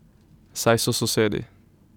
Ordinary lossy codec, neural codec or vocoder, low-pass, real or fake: none; none; 19.8 kHz; real